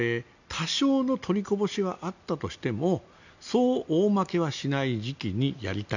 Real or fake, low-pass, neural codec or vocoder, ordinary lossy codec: real; 7.2 kHz; none; none